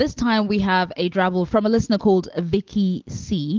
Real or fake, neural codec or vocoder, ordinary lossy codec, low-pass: real; none; Opus, 16 kbps; 7.2 kHz